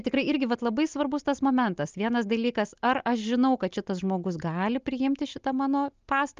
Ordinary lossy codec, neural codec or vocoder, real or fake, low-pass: Opus, 32 kbps; none; real; 7.2 kHz